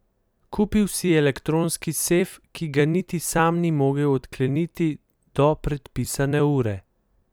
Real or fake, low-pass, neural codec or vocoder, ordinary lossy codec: fake; none; vocoder, 44.1 kHz, 128 mel bands every 256 samples, BigVGAN v2; none